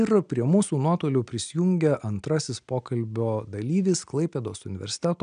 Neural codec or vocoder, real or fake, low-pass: none; real; 9.9 kHz